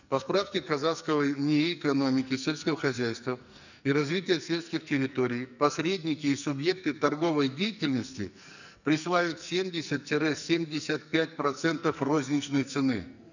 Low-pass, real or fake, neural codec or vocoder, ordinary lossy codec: 7.2 kHz; fake; codec, 44.1 kHz, 2.6 kbps, SNAC; none